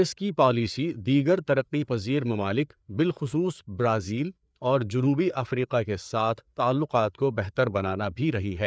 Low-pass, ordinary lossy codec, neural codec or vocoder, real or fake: none; none; codec, 16 kHz, 4 kbps, FreqCodec, larger model; fake